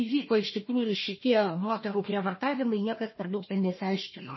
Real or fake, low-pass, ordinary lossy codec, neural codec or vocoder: fake; 7.2 kHz; MP3, 24 kbps; codec, 16 kHz, 1 kbps, FunCodec, trained on Chinese and English, 50 frames a second